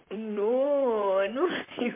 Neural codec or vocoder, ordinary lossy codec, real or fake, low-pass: vocoder, 44.1 kHz, 128 mel bands, Pupu-Vocoder; MP3, 32 kbps; fake; 3.6 kHz